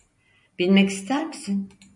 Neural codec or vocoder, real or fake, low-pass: none; real; 10.8 kHz